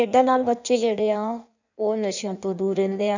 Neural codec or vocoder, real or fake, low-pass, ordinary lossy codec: codec, 16 kHz in and 24 kHz out, 1.1 kbps, FireRedTTS-2 codec; fake; 7.2 kHz; none